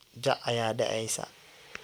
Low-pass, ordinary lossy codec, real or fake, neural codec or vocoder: none; none; real; none